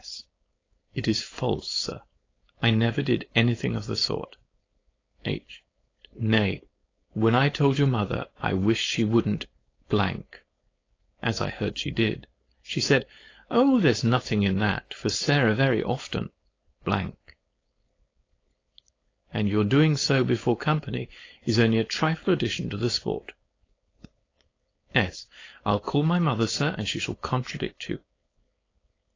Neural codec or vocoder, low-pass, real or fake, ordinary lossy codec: codec, 16 kHz, 4.8 kbps, FACodec; 7.2 kHz; fake; AAC, 32 kbps